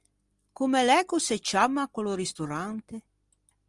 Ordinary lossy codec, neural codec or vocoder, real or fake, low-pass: Opus, 24 kbps; none; real; 10.8 kHz